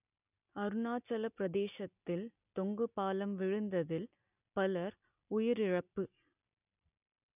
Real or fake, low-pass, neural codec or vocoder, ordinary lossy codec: real; 3.6 kHz; none; none